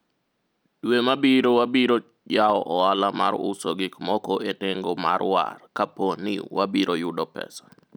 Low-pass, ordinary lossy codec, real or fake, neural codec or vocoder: none; none; real; none